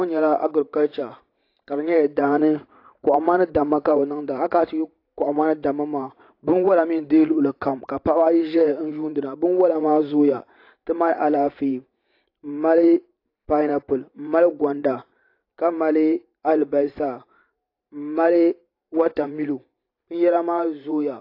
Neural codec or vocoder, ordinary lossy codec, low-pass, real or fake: vocoder, 44.1 kHz, 128 mel bands every 512 samples, BigVGAN v2; AAC, 32 kbps; 5.4 kHz; fake